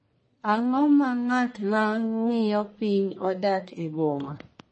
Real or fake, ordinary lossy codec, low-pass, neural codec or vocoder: fake; MP3, 32 kbps; 9.9 kHz; codec, 44.1 kHz, 1.7 kbps, Pupu-Codec